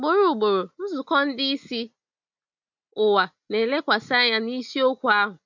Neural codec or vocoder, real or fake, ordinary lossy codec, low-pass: none; real; MP3, 64 kbps; 7.2 kHz